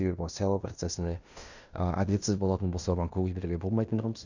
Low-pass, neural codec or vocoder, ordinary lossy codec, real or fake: 7.2 kHz; codec, 16 kHz in and 24 kHz out, 0.9 kbps, LongCat-Audio-Codec, fine tuned four codebook decoder; none; fake